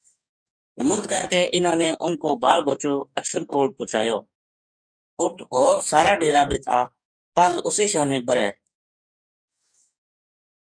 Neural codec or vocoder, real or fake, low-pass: codec, 44.1 kHz, 2.6 kbps, DAC; fake; 9.9 kHz